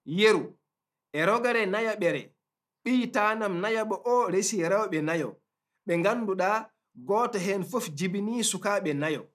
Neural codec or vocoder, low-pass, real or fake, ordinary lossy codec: none; 14.4 kHz; real; none